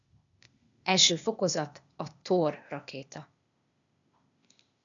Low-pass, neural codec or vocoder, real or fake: 7.2 kHz; codec, 16 kHz, 0.8 kbps, ZipCodec; fake